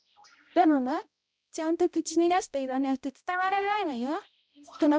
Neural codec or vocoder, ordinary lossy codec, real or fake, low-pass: codec, 16 kHz, 0.5 kbps, X-Codec, HuBERT features, trained on balanced general audio; none; fake; none